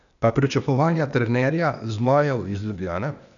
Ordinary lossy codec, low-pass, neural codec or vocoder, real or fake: none; 7.2 kHz; codec, 16 kHz, 0.8 kbps, ZipCodec; fake